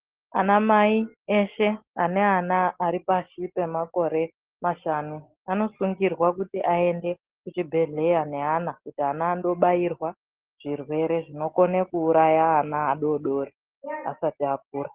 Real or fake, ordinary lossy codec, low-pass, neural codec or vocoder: real; Opus, 16 kbps; 3.6 kHz; none